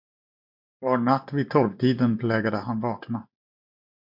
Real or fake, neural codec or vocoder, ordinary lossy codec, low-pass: real; none; MP3, 48 kbps; 5.4 kHz